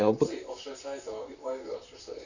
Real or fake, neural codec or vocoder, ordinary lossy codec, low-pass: fake; vocoder, 44.1 kHz, 128 mel bands, Pupu-Vocoder; none; 7.2 kHz